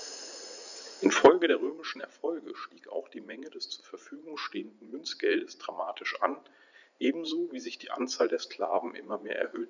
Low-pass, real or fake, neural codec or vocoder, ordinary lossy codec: 7.2 kHz; fake; vocoder, 44.1 kHz, 80 mel bands, Vocos; none